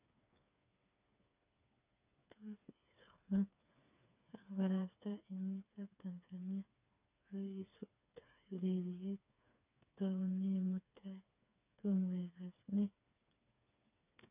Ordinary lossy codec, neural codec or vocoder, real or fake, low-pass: none; codec, 16 kHz, 4 kbps, FreqCodec, smaller model; fake; 3.6 kHz